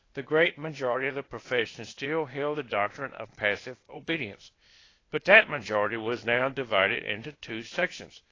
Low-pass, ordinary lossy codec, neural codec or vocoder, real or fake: 7.2 kHz; AAC, 32 kbps; codec, 16 kHz, 0.8 kbps, ZipCodec; fake